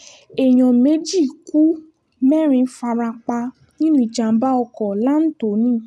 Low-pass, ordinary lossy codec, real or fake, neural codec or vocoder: none; none; real; none